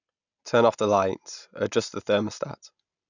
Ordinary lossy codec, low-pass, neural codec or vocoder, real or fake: none; 7.2 kHz; none; real